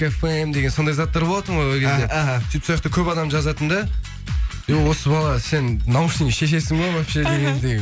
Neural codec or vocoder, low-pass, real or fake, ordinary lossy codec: none; none; real; none